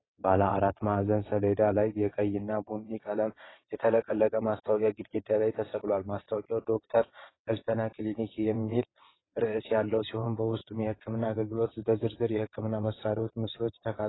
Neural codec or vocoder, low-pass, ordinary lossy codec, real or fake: vocoder, 22.05 kHz, 80 mel bands, WaveNeXt; 7.2 kHz; AAC, 16 kbps; fake